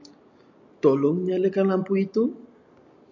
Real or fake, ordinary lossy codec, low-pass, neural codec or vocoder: real; MP3, 48 kbps; 7.2 kHz; none